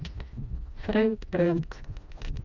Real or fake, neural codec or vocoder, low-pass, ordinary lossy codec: fake; codec, 16 kHz, 1 kbps, FreqCodec, smaller model; 7.2 kHz; none